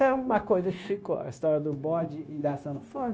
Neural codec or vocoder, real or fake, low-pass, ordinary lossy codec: codec, 16 kHz, 0.9 kbps, LongCat-Audio-Codec; fake; none; none